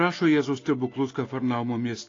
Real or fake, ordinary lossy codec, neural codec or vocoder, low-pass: real; AAC, 32 kbps; none; 7.2 kHz